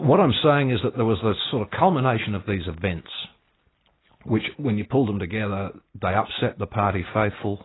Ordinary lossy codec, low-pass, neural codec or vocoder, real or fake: AAC, 16 kbps; 7.2 kHz; none; real